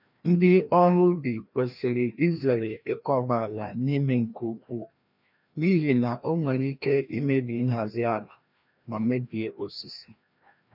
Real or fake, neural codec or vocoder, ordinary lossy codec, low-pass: fake; codec, 16 kHz, 1 kbps, FreqCodec, larger model; none; 5.4 kHz